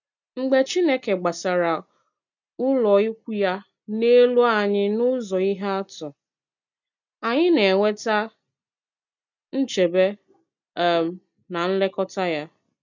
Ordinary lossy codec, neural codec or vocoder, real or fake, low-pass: none; none; real; 7.2 kHz